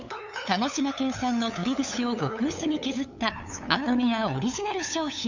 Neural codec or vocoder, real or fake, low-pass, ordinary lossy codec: codec, 16 kHz, 8 kbps, FunCodec, trained on LibriTTS, 25 frames a second; fake; 7.2 kHz; none